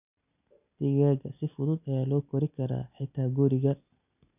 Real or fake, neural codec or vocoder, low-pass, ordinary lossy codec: real; none; 3.6 kHz; none